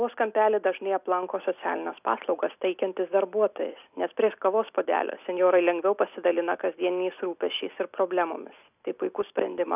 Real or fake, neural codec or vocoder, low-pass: real; none; 3.6 kHz